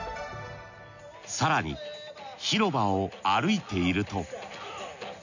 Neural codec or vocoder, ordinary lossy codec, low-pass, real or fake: none; none; 7.2 kHz; real